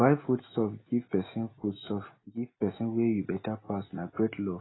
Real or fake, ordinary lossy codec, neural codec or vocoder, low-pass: real; AAC, 16 kbps; none; 7.2 kHz